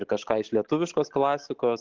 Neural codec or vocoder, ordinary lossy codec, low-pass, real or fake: none; Opus, 16 kbps; 7.2 kHz; real